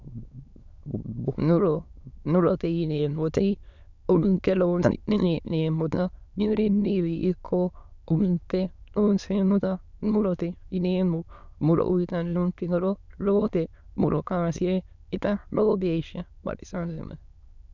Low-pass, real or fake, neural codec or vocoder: 7.2 kHz; fake; autoencoder, 22.05 kHz, a latent of 192 numbers a frame, VITS, trained on many speakers